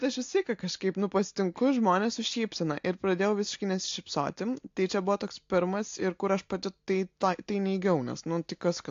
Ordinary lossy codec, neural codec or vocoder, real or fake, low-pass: AAC, 48 kbps; none; real; 7.2 kHz